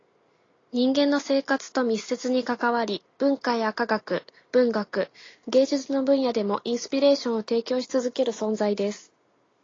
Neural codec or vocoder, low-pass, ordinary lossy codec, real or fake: none; 7.2 kHz; AAC, 32 kbps; real